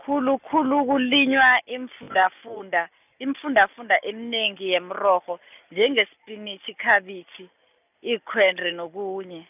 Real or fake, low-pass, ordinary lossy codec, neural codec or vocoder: real; 3.6 kHz; none; none